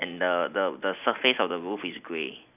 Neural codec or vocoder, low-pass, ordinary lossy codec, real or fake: none; 3.6 kHz; none; real